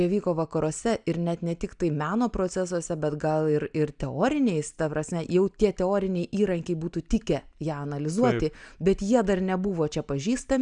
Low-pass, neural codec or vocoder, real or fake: 9.9 kHz; none; real